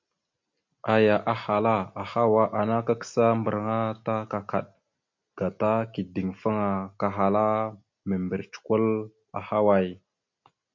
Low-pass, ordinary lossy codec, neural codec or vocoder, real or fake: 7.2 kHz; MP3, 48 kbps; none; real